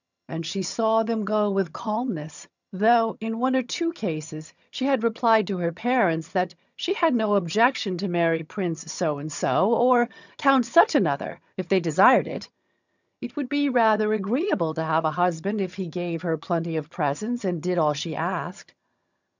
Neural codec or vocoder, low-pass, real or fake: vocoder, 22.05 kHz, 80 mel bands, HiFi-GAN; 7.2 kHz; fake